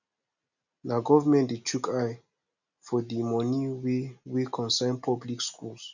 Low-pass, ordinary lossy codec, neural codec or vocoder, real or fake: 7.2 kHz; none; none; real